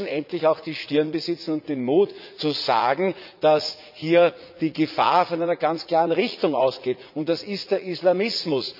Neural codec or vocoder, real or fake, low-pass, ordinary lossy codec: vocoder, 44.1 kHz, 80 mel bands, Vocos; fake; 5.4 kHz; none